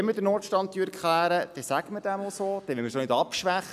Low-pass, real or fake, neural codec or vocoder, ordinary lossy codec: 14.4 kHz; real; none; none